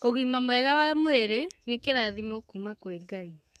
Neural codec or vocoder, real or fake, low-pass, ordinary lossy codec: codec, 32 kHz, 1.9 kbps, SNAC; fake; 14.4 kHz; none